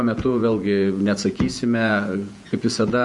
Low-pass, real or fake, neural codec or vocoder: 10.8 kHz; fake; vocoder, 48 kHz, 128 mel bands, Vocos